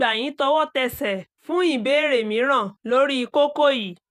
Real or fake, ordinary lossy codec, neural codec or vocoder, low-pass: fake; none; vocoder, 48 kHz, 128 mel bands, Vocos; 14.4 kHz